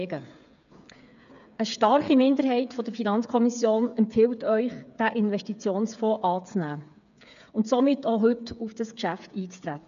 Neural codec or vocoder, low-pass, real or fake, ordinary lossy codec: codec, 16 kHz, 8 kbps, FreqCodec, smaller model; 7.2 kHz; fake; none